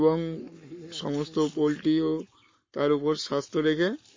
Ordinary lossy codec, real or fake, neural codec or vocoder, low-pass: MP3, 32 kbps; fake; codec, 44.1 kHz, 7.8 kbps, Pupu-Codec; 7.2 kHz